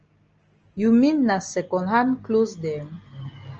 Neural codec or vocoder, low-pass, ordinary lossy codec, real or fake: none; 7.2 kHz; Opus, 24 kbps; real